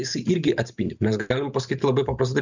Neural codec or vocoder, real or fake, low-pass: none; real; 7.2 kHz